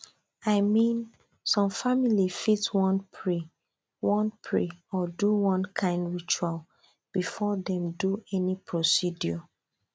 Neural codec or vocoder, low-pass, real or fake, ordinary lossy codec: none; none; real; none